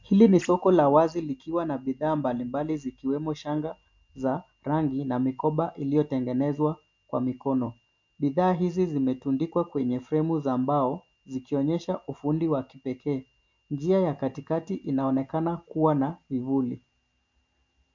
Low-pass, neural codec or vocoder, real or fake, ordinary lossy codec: 7.2 kHz; none; real; MP3, 48 kbps